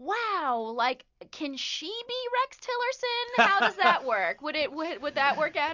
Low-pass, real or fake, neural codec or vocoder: 7.2 kHz; real; none